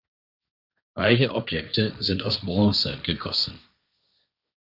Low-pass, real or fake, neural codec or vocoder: 5.4 kHz; fake; codec, 16 kHz, 1.1 kbps, Voila-Tokenizer